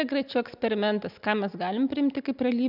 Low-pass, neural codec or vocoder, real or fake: 5.4 kHz; none; real